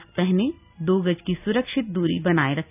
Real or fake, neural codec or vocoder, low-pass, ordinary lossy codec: real; none; 3.6 kHz; none